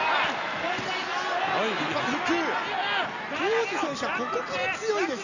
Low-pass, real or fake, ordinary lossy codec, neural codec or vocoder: 7.2 kHz; real; none; none